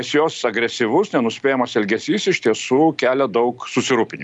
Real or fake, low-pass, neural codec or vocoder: real; 10.8 kHz; none